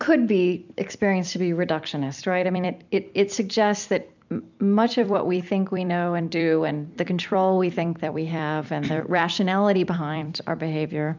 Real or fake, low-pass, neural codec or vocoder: fake; 7.2 kHz; vocoder, 44.1 kHz, 128 mel bands every 256 samples, BigVGAN v2